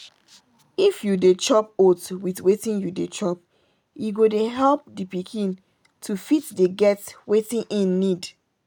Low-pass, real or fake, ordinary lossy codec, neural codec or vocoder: 19.8 kHz; real; none; none